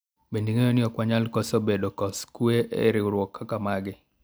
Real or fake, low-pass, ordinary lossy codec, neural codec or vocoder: real; none; none; none